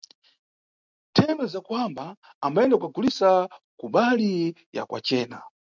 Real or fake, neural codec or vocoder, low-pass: real; none; 7.2 kHz